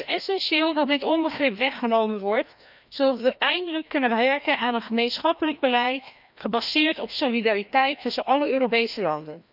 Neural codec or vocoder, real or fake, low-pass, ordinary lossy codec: codec, 16 kHz, 1 kbps, FreqCodec, larger model; fake; 5.4 kHz; none